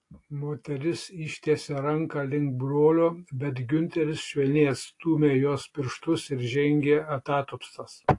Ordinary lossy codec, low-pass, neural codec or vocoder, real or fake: AAC, 48 kbps; 10.8 kHz; none; real